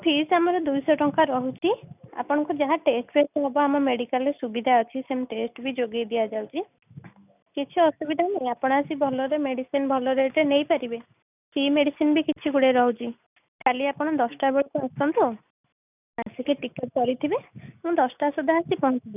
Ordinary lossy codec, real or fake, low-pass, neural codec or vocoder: none; real; 3.6 kHz; none